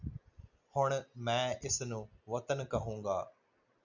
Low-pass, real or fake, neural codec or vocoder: 7.2 kHz; real; none